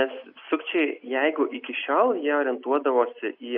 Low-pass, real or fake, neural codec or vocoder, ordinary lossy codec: 5.4 kHz; real; none; AAC, 48 kbps